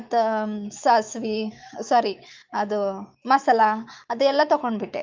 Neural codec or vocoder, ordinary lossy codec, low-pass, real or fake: autoencoder, 48 kHz, 128 numbers a frame, DAC-VAE, trained on Japanese speech; Opus, 24 kbps; 7.2 kHz; fake